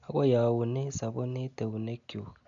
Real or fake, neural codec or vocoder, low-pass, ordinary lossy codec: real; none; 7.2 kHz; none